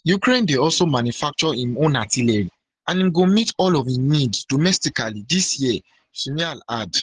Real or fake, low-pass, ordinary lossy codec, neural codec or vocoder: real; 10.8 kHz; Opus, 16 kbps; none